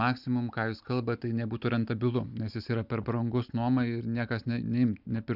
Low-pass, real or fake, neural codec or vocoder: 5.4 kHz; real; none